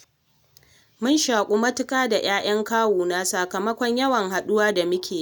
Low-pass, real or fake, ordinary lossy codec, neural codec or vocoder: none; real; none; none